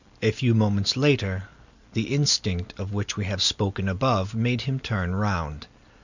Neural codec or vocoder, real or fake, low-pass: none; real; 7.2 kHz